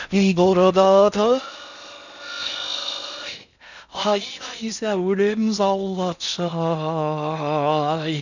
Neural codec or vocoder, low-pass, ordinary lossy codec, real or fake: codec, 16 kHz in and 24 kHz out, 0.6 kbps, FocalCodec, streaming, 4096 codes; 7.2 kHz; none; fake